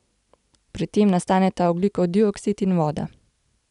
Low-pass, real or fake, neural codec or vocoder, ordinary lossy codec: 10.8 kHz; real; none; none